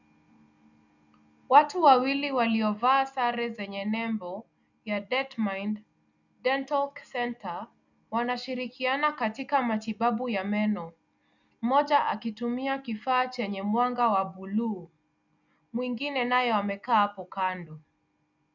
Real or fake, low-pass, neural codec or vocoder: real; 7.2 kHz; none